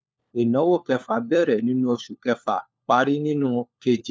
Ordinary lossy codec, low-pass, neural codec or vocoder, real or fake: none; none; codec, 16 kHz, 4 kbps, FunCodec, trained on LibriTTS, 50 frames a second; fake